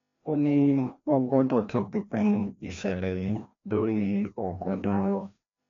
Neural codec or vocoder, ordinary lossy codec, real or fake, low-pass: codec, 16 kHz, 1 kbps, FreqCodec, larger model; MP3, 64 kbps; fake; 7.2 kHz